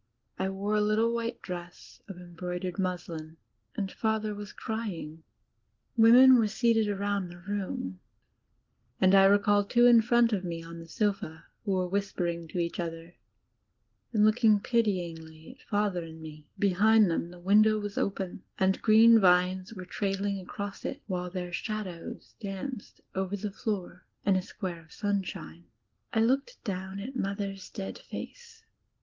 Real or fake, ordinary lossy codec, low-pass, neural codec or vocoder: real; Opus, 16 kbps; 7.2 kHz; none